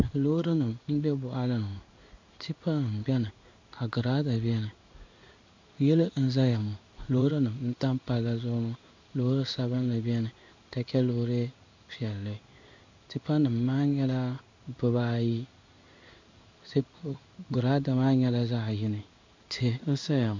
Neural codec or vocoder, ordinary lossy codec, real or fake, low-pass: codec, 16 kHz in and 24 kHz out, 1 kbps, XY-Tokenizer; MP3, 64 kbps; fake; 7.2 kHz